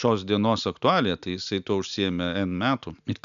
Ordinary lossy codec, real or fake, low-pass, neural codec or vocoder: MP3, 96 kbps; real; 7.2 kHz; none